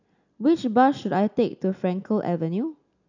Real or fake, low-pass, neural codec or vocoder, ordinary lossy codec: real; 7.2 kHz; none; none